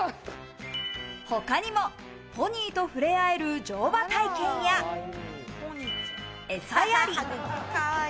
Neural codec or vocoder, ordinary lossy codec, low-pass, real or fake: none; none; none; real